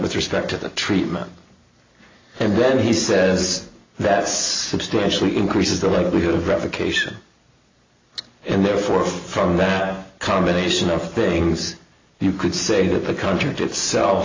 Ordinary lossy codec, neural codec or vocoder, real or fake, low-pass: AAC, 32 kbps; none; real; 7.2 kHz